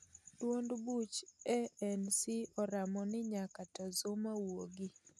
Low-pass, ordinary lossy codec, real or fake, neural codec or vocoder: none; none; real; none